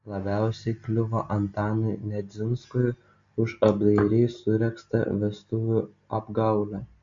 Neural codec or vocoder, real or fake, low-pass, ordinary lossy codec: none; real; 7.2 kHz; MP3, 48 kbps